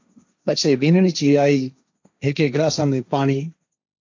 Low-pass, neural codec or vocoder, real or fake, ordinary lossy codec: 7.2 kHz; codec, 16 kHz, 1.1 kbps, Voila-Tokenizer; fake; AAC, 48 kbps